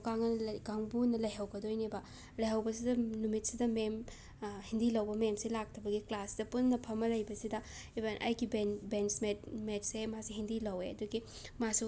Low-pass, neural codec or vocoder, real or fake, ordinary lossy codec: none; none; real; none